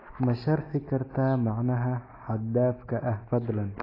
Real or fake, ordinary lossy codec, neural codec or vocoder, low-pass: real; AAC, 24 kbps; none; 5.4 kHz